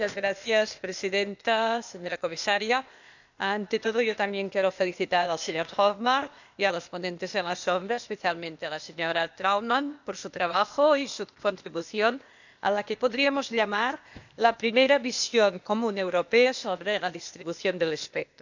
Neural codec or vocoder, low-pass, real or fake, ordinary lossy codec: codec, 16 kHz, 0.8 kbps, ZipCodec; 7.2 kHz; fake; none